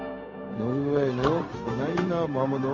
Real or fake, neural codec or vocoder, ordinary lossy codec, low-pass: fake; codec, 16 kHz, 0.4 kbps, LongCat-Audio-Codec; MP3, 32 kbps; 7.2 kHz